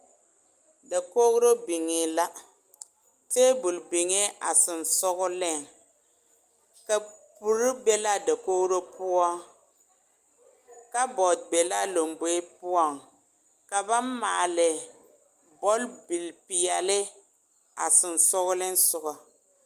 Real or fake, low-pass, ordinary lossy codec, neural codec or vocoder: real; 14.4 kHz; Opus, 32 kbps; none